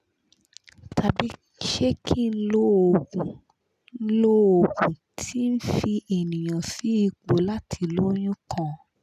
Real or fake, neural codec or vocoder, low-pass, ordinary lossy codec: real; none; 14.4 kHz; none